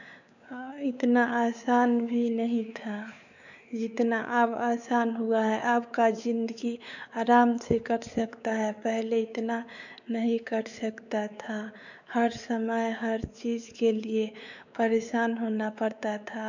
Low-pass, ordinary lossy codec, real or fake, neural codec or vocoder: 7.2 kHz; none; fake; codec, 16 kHz, 4 kbps, X-Codec, WavLM features, trained on Multilingual LibriSpeech